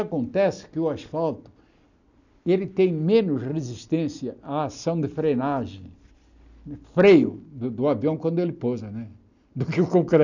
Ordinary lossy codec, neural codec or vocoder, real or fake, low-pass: none; none; real; 7.2 kHz